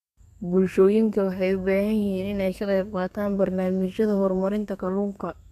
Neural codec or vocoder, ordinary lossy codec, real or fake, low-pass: codec, 32 kHz, 1.9 kbps, SNAC; none; fake; 14.4 kHz